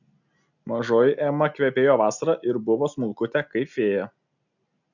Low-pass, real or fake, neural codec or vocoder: 7.2 kHz; real; none